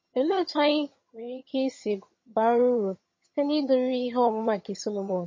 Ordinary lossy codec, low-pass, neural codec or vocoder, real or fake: MP3, 32 kbps; 7.2 kHz; vocoder, 22.05 kHz, 80 mel bands, HiFi-GAN; fake